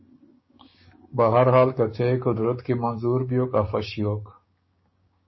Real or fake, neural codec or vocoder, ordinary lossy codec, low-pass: fake; codec, 16 kHz, 6 kbps, DAC; MP3, 24 kbps; 7.2 kHz